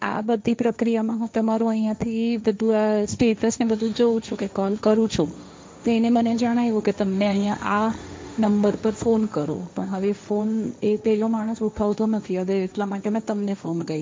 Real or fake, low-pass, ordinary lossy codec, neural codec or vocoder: fake; none; none; codec, 16 kHz, 1.1 kbps, Voila-Tokenizer